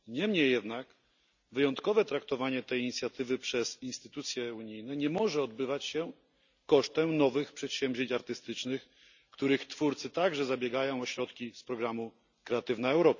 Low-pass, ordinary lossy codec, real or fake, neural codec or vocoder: 7.2 kHz; none; real; none